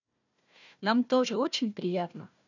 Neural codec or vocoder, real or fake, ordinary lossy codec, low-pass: codec, 16 kHz, 1 kbps, FunCodec, trained on Chinese and English, 50 frames a second; fake; none; 7.2 kHz